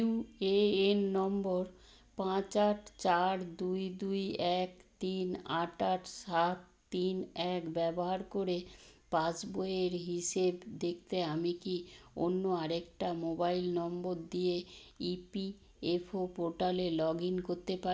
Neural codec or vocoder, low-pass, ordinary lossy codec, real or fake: none; none; none; real